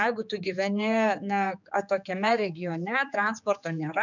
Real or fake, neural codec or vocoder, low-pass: fake; codec, 16 kHz, 4 kbps, X-Codec, HuBERT features, trained on general audio; 7.2 kHz